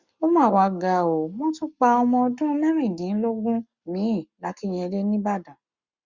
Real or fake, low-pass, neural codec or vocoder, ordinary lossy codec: fake; 7.2 kHz; codec, 44.1 kHz, 7.8 kbps, Pupu-Codec; Opus, 64 kbps